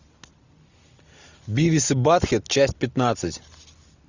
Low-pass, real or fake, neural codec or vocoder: 7.2 kHz; real; none